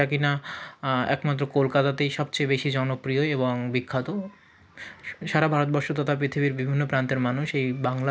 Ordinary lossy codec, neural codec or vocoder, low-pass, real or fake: none; none; none; real